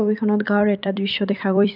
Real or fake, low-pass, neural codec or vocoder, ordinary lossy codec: fake; 5.4 kHz; vocoder, 44.1 kHz, 128 mel bands every 256 samples, BigVGAN v2; none